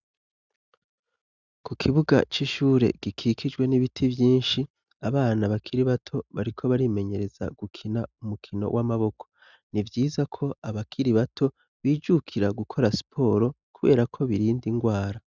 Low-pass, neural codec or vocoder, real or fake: 7.2 kHz; none; real